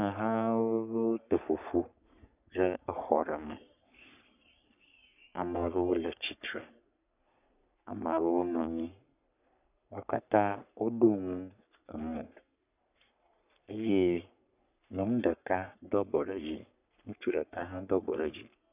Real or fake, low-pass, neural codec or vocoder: fake; 3.6 kHz; codec, 44.1 kHz, 3.4 kbps, Pupu-Codec